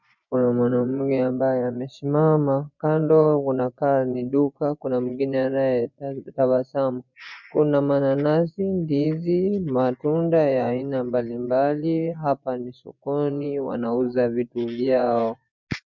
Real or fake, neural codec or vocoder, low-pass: fake; vocoder, 24 kHz, 100 mel bands, Vocos; 7.2 kHz